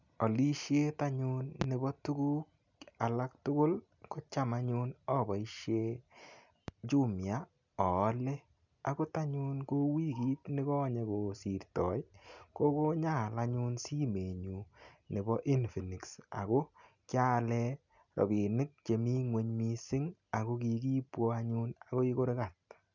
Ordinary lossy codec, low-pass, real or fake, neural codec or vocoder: none; 7.2 kHz; real; none